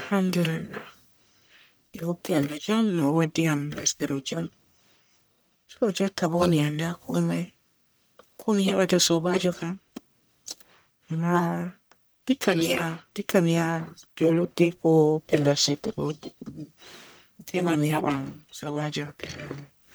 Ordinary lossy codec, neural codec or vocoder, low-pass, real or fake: none; codec, 44.1 kHz, 1.7 kbps, Pupu-Codec; none; fake